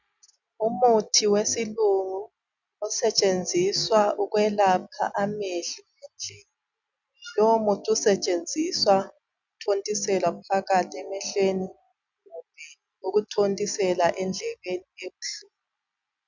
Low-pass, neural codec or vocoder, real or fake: 7.2 kHz; none; real